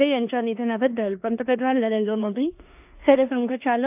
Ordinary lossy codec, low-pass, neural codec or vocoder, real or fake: AAC, 32 kbps; 3.6 kHz; codec, 16 kHz in and 24 kHz out, 0.9 kbps, LongCat-Audio-Codec, four codebook decoder; fake